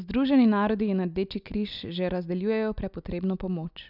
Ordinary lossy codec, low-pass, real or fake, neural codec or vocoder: none; 5.4 kHz; real; none